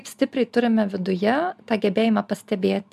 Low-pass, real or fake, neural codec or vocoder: 14.4 kHz; real; none